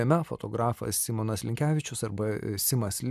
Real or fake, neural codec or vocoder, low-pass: real; none; 14.4 kHz